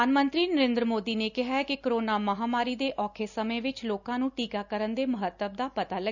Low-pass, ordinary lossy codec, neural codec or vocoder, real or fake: 7.2 kHz; none; none; real